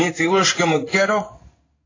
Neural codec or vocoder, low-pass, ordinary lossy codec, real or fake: codec, 16 kHz in and 24 kHz out, 1 kbps, XY-Tokenizer; 7.2 kHz; AAC, 32 kbps; fake